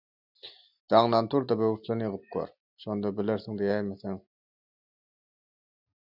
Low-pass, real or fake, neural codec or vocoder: 5.4 kHz; real; none